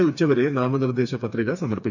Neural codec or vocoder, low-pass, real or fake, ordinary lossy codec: codec, 16 kHz, 4 kbps, FreqCodec, smaller model; 7.2 kHz; fake; none